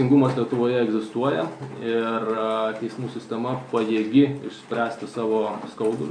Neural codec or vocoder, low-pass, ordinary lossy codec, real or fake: none; 9.9 kHz; MP3, 64 kbps; real